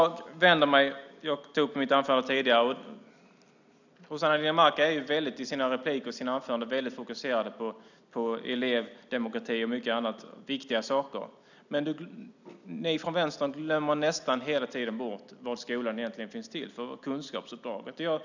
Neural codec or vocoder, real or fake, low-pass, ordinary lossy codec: none; real; 7.2 kHz; none